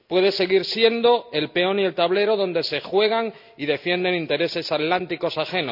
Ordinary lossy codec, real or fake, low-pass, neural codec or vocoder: none; real; 5.4 kHz; none